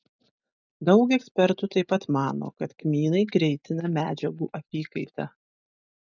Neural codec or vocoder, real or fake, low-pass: none; real; 7.2 kHz